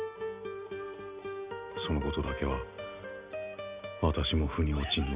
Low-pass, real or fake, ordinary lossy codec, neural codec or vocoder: 3.6 kHz; real; Opus, 64 kbps; none